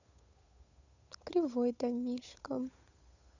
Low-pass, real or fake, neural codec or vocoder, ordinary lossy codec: 7.2 kHz; fake; codec, 16 kHz, 8 kbps, FunCodec, trained on Chinese and English, 25 frames a second; none